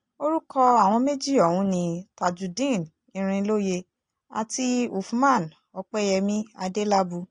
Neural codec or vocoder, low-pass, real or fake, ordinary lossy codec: none; 9.9 kHz; real; AAC, 48 kbps